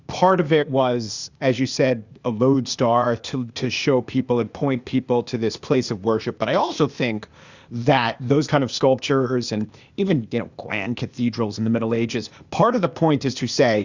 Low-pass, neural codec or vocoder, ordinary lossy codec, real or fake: 7.2 kHz; codec, 16 kHz, 0.8 kbps, ZipCodec; Opus, 64 kbps; fake